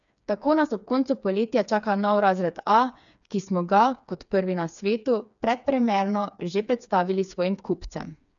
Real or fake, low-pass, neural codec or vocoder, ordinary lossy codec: fake; 7.2 kHz; codec, 16 kHz, 4 kbps, FreqCodec, smaller model; none